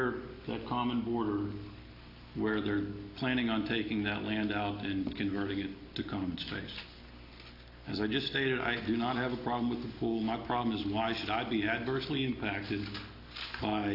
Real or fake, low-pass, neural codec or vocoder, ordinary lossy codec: real; 5.4 kHz; none; AAC, 32 kbps